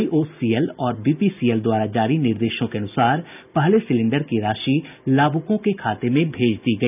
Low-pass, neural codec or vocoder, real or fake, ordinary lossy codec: 3.6 kHz; none; real; none